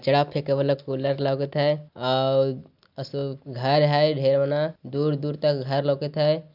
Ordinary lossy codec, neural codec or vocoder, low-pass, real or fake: none; none; 5.4 kHz; real